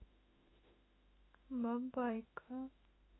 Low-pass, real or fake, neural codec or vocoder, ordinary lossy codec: 7.2 kHz; fake; autoencoder, 48 kHz, 32 numbers a frame, DAC-VAE, trained on Japanese speech; AAC, 16 kbps